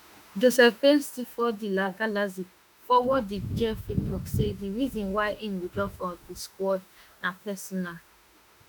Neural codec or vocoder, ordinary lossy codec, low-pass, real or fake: autoencoder, 48 kHz, 32 numbers a frame, DAC-VAE, trained on Japanese speech; none; 19.8 kHz; fake